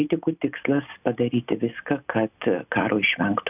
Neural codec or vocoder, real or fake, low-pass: none; real; 3.6 kHz